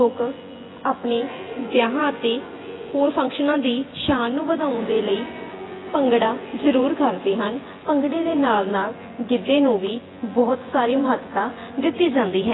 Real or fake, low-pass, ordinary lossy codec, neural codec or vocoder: fake; 7.2 kHz; AAC, 16 kbps; vocoder, 24 kHz, 100 mel bands, Vocos